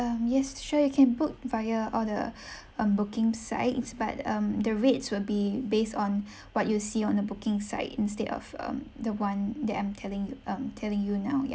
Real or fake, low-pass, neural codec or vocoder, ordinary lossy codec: real; none; none; none